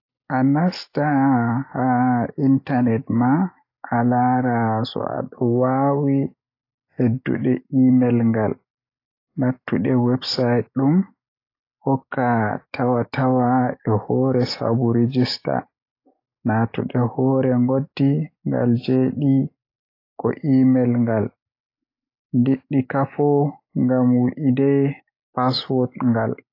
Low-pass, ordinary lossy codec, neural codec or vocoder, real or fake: 5.4 kHz; AAC, 32 kbps; none; real